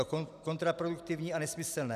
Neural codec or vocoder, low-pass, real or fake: none; 14.4 kHz; real